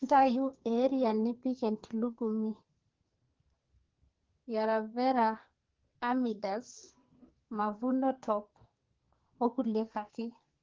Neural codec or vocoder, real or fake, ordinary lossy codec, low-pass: codec, 44.1 kHz, 2.6 kbps, SNAC; fake; Opus, 16 kbps; 7.2 kHz